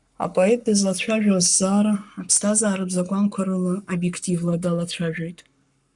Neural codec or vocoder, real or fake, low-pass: codec, 44.1 kHz, 7.8 kbps, Pupu-Codec; fake; 10.8 kHz